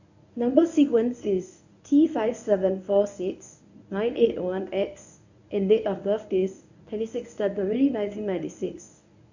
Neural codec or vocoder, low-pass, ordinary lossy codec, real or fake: codec, 24 kHz, 0.9 kbps, WavTokenizer, medium speech release version 1; 7.2 kHz; none; fake